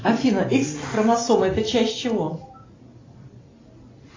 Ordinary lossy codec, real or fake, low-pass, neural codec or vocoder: AAC, 32 kbps; real; 7.2 kHz; none